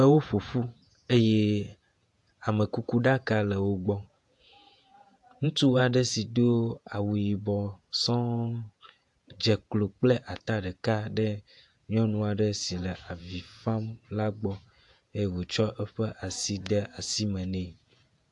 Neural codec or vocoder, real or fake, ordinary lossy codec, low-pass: vocoder, 48 kHz, 128 mel bands, Vocos; fake; AAC, 64 kbps; 10.8 kHz